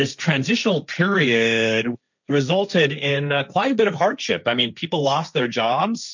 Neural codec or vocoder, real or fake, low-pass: codec, 16 kHz, 1.1 kbps, Voila-Tokenizer; fake; 7.2 kHz